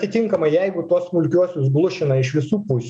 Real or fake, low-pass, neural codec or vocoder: real; 9.9 kHz; none